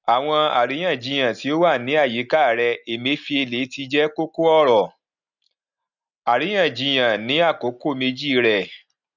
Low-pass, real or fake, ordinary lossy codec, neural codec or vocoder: 7.2 kHz; real; none; none